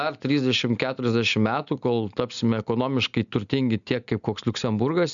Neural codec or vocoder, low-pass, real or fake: none; 7.2 kHz; real